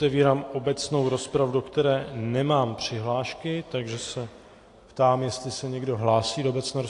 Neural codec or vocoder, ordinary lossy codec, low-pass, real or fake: none; AAC, 48 kbps; 10.8 kHz; real